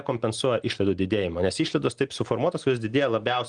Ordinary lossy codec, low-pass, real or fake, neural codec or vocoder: Opus, 24 kbps; 9.9 kHz; real; none